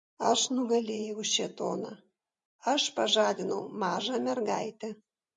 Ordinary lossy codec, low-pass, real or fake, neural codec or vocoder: MP3, 48 kbps; 10.8 kHz; fake; vocoder, 44.1 kHz, 128 mel bands every 512 samples, BigVGAN v2